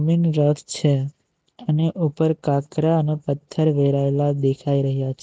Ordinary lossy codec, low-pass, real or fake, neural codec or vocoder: none; none; real; none